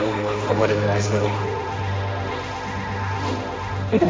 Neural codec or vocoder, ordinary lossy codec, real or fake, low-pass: codec, 16 kHz, 1.1 kbps, Voila-Tokenizer; none; fake; none